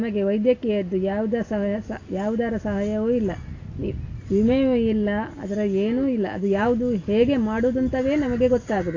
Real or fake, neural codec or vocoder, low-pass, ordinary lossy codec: real; none; 7.2 kHz; AAC, 32 kbps